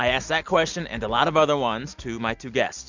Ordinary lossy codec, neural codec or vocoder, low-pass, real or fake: Opus, 64 kbps; none; 7.2 kHz; real